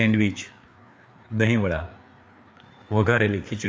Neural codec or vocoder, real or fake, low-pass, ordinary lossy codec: codec, 16 kHz, 4 kbps, FreqCodec, larger model; fake; none; none